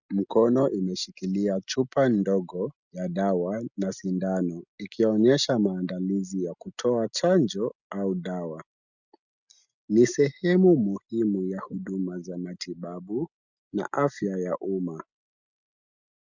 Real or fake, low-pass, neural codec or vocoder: real; 7.2 kHz; none